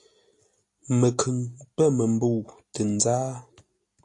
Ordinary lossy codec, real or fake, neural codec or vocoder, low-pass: AAC, 48 kbps; real; none; 10.8 kHz